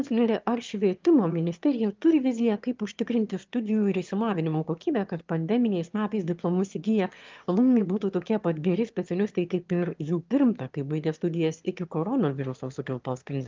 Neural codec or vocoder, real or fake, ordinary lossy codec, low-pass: autoencoder, 22.05 kHz, a latent of 192 numbers a frame, VITS, trained on one speaker; fake; Opus, 24 kbps; 7.2 kHz